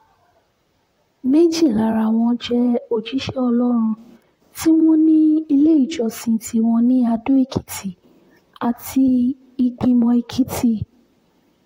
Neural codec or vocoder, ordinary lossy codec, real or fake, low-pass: vocoder, 44.1 kHz, 128 mel bands, Pupu-Vocoder; AAC, 48 kbps; fake; 19.8 kHz